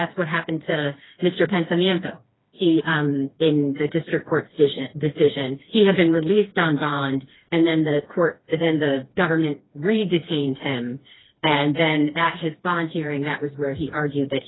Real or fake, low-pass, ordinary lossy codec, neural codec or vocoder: fake; 7.2 kHz; AAC, 16 kbps; codec, 16 kHz, 2 kbps, FreqCodec, smaller model